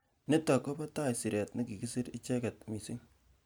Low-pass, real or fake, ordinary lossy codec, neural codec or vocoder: none; real; none; none